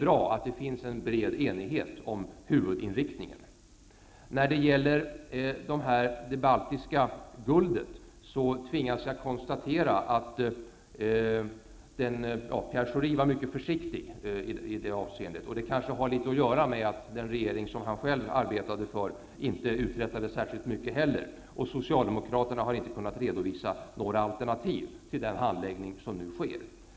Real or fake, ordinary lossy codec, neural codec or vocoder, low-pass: real; none; none; none